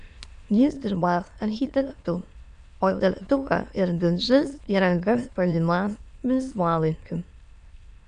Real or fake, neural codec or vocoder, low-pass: fake; autoencoder, 22.05 kHz, a latent of 192 numbers a frame, VITS, trained on many speakers; 9.9 kHz